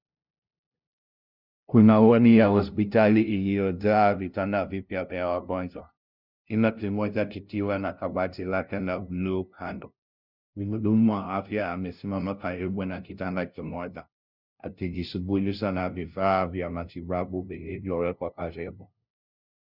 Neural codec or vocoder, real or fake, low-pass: codec, 16 kHz, 0.5 kbps, FunCodec, trained on LibriTTS, 25 frames a second; fake; 5.4 kHz